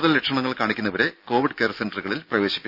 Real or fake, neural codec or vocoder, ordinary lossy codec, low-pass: real; none; none; 5.4 kHz